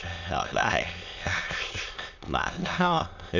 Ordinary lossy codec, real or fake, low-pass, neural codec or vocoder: none; fake; 7.2 kHz; autoencoder, 22.05 kHz, a latent of 192 numbers a frame, VITS, trained on many speakers